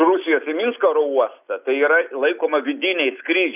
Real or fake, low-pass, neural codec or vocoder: real; 3.6 kHz; none